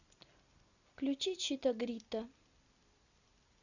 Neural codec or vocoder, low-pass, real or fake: none; 7.2 kHz; real